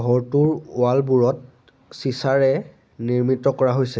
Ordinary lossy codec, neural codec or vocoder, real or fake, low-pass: none; none; real; none